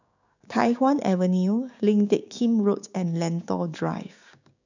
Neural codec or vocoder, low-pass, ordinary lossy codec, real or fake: codec, 16 kHz, 6 kbps, DAC; 7.2 kHz; none; fake